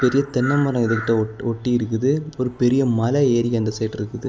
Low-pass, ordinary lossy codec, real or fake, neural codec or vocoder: none; none; real; none